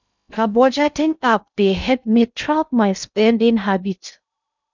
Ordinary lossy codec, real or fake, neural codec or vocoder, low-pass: none; fake; codec, 16 kHz in and 24 kHz out, 0.6 kbps, FocalCodec, streaming, 2048 codes; 7.2 kHz